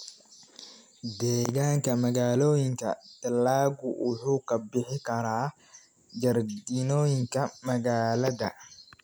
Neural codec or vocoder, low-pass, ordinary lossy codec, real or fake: none; none; none; real